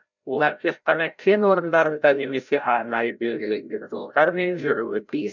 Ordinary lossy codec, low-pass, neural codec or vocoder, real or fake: none; 7.2 kHz; codec, 16 kHz, 0.5 kbps, FreqCodec, larger model; fake